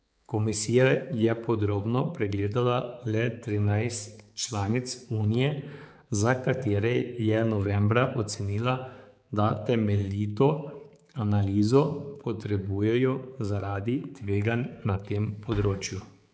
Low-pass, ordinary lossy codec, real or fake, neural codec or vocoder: none; none; fake; codec, 16 kHz, 4 kbps, X-Codec, HuBERT features, trained on balanced general audio